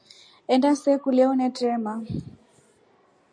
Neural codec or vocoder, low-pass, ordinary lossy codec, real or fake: none; 9.9 kHz; MP3, 64 kbps; real